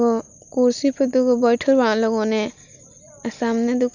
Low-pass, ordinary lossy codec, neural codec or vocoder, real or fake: 7.2 kHz; none; none; real